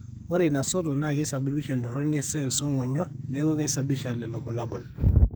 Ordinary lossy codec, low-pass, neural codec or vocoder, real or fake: none; none; codec, 44.1 kHz, 2.6 kbps, SNAC; fake